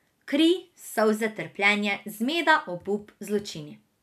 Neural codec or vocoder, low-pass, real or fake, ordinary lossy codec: none; 14.4 kHz; real; none